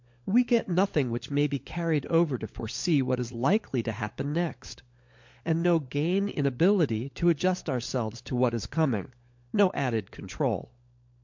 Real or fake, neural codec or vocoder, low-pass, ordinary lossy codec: fake; codec, 16 kHz, 16 kbps, FunCodec, trained on LibriTTS, 50 frames a second; 7.2 kHz; MP3, 48 kbps